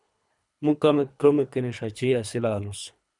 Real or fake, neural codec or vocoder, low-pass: fake; codec, 24 kHz, 3 kbps, HILCodec; 10.8 kHz